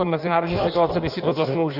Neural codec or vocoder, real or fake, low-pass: codec, 16 kHz in and 24 kHz out, 1.1 kbps, FireRedTTS-2 codec; fake; 5.4 kHz